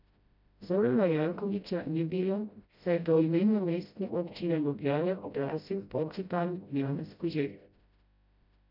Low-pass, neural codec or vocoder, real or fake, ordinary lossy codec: 5.4 kHz; codec, 16 kHz, 0.5 kbps, FreqCodec, smaller model; fake; none